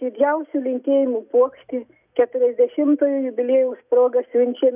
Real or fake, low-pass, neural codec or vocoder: real; 3.6 kHz; none